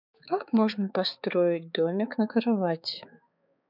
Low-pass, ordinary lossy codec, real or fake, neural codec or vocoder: 5.4 kHz; none; fake; codec, 16 kHz, 4 kbps, X-Codec, HuBERT features, trained on balanced general audio